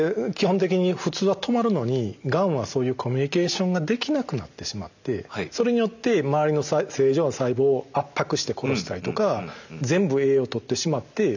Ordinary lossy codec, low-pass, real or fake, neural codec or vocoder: none; 7.2 kHz; real; none